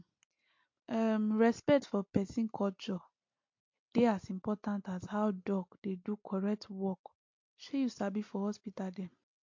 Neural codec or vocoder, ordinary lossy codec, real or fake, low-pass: none; MP3, 48 kbps; real; 7.2 kHz